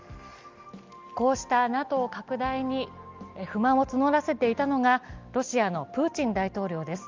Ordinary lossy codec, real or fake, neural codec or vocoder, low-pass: Opus, 32 kbps; real; none; 7.2 kHz